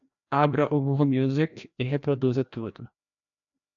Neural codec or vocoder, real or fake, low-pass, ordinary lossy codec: codec, 16 kHz, 1 kbps, FreqCodec, larger model; fake; 7.2 kHz; AAC, 64 kbps